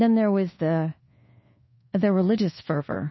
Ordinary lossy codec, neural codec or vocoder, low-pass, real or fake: MP3, 24 kbps; codec, 24 kHz, 1.2 kbps, DualCodec; 7.2 kHz; fake